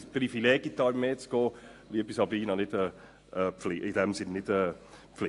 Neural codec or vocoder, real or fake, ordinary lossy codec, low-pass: none; real; AAC, 48 kbps; 10.8 kHz